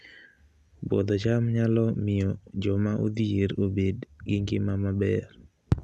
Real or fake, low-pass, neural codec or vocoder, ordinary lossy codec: real; none; none; none